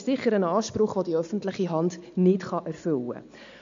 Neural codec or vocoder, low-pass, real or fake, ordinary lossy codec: none; 7.2 kHz; real; MP3, 64 kbps